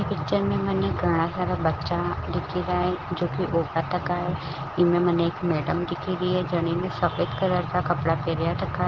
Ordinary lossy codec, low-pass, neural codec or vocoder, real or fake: Opus, 16 kbps; 7.2 kHz; none; real